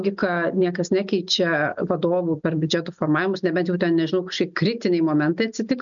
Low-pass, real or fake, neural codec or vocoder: 7.2 kHz; real; none